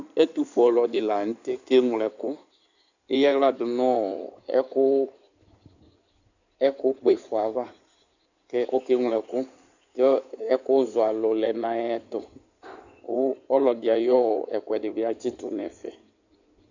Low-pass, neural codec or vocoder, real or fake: 7.2 kHz; codec, 16 kHz in and 24 kHz out, 2.2 kbps, FireRedTTS-2 codec; fake